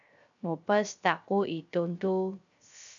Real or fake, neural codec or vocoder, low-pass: fake; codec, 16 kHz, 0.3 kbps, FocalCodec; 7.2 kHz